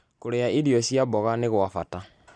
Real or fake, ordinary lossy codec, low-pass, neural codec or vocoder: real; none; 9.9 kHz; none